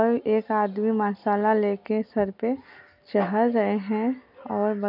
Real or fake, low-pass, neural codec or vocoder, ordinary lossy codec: real; 5.4 kHz; none; none